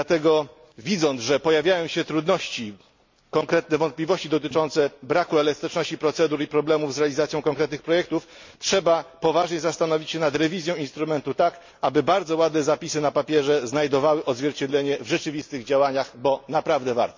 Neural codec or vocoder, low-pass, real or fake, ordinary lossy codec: none; 7.2 kHz; real; MP3, 48 kbps